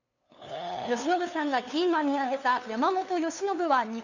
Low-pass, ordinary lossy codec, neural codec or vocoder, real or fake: 7.2 kHz; none; codec, 16 kHz, 2 kbps, FunCodec, trained on LibriTTS, 25 frames a second; fake